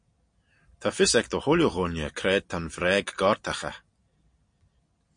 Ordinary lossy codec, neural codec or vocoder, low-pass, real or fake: MP3, 48 kbps; none; 9.9 kHz; real